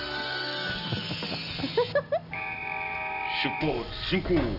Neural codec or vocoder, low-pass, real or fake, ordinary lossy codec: none; 5.4 kHz; real; none